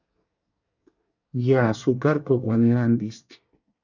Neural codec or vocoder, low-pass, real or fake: codec, 24 kHz, 1 kbps, SNAC; 7.2 kHz; fake